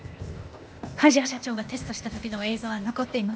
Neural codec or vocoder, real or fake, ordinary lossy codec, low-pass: codec, 16 kHz, 0.8 kbps, ZipCodec; fake; none; none